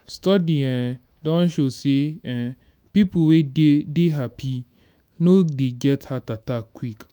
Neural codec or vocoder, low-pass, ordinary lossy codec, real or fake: autoencoder, 48 kHz, 128 numbers a frame, DAC-VAE, trained on Japanese speech; none; none; fake